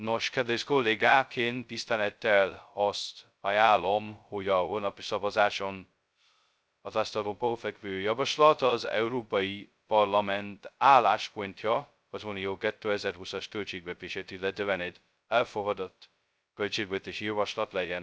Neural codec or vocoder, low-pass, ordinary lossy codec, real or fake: codec, 16 kHz, 0.2 kbps, FocalCodec; none; none; fake